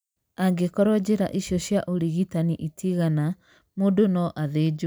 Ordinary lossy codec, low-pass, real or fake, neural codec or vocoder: none; none; real; none